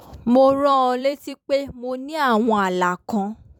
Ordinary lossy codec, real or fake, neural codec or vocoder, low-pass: none; fake; vocoder, 44.1 kHz, 128 mel bands every 256 samples, BigVGAN v2; 19.8 kHz